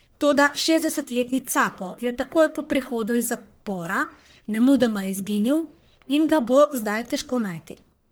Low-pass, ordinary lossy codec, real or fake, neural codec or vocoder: none; none; fake; codec, 44.1 kHz, 1.7 kbps, Pupu-Codec